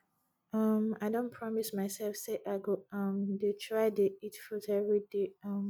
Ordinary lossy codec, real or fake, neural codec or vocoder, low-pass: none; real; none; none